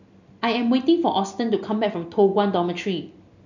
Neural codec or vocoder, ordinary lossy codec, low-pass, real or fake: none; none; 7.2 kHz; real